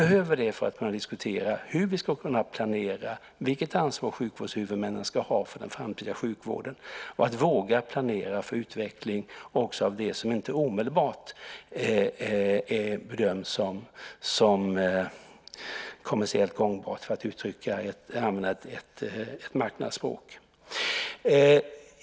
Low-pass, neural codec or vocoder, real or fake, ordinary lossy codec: none; none; real; none